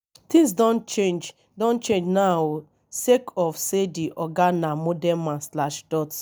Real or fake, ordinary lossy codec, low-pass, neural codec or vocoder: real; none; none; none